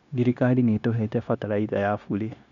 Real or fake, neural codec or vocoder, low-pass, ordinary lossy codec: fake; codec, 16 kHz, 0.9 kbps, LongCat-Audio-Codec; 7.2 kHz; none